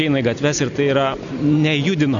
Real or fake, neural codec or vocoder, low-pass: real; none; 7.2 kHz